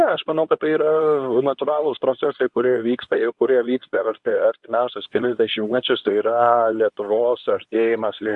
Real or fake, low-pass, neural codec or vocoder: fake; 10.8 kHz; codec, 24 kHz, 0.9 kbps, WavTokenizer, medium speech release version 2